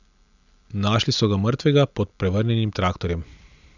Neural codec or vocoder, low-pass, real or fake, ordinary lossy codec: none; 7.2 kHz; real; none